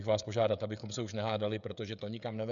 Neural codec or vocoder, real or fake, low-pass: codec, 16 kHz, 16 kbps, FreqCodec, smaller model; fake; 7.2 kHz